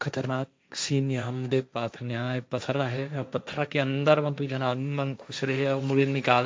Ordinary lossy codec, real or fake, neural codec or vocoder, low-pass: none; fake; codec, 16 kHz, 1.1 kbps, Voila-Tokenizer; none